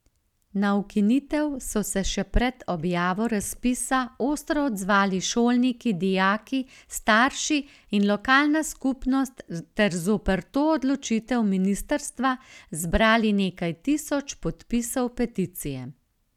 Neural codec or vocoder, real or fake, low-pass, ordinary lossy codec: none; real; 19.8 kHz; none